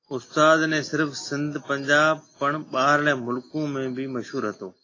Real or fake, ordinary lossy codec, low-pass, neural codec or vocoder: real; AAC, 32 kbps; 7.2 kHz; none